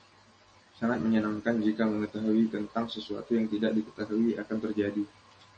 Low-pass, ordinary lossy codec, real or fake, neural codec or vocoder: 9.9 kHz; MP3, 32 kbps; real; none